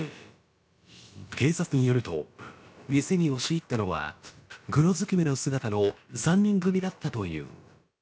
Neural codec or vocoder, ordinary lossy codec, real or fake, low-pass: codec, 16 kHz, about 1 kbps, DyCAST, with the encoder's durations; none; fake; none